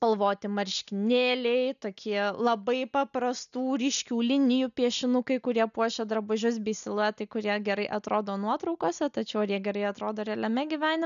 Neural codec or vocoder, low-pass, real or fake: none; 7.2 kHz; real